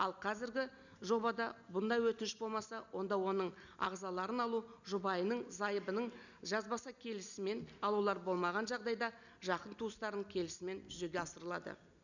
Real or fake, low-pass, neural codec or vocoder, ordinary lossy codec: real; 7.2 kHz; none; none